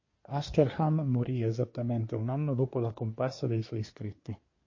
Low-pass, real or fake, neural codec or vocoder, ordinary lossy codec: 7.2 kHz; fake; codec, 24 kHz, 1 kbps, SNAC; MP3, 32 kbps